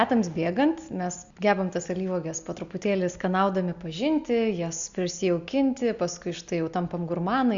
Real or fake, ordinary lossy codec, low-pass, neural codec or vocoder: real; Opus, 64 kbps; 7.2 kHz; none